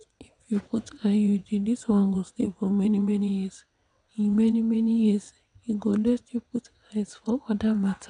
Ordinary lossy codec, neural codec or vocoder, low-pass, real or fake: none; vocoder, 22.05 kHz, 80 mel bands, WaveNeXt; 9.9 kHz; fake